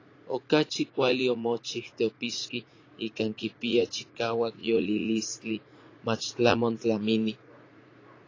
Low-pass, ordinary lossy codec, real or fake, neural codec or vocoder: 7.2 kHz; AAC, 32 kbps; fake; vocoder, 44.1 kHz, 80 mel bands, Vocos